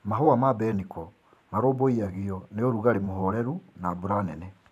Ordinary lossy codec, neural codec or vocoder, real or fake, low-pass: none; vocoder, 44.1 kHz, 128 mel bands every 512 samples, BigVGAN v2; fake; 14.4 kHz